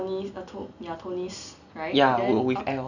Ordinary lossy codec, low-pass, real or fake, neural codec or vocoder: none; 7.2 kHz; real; none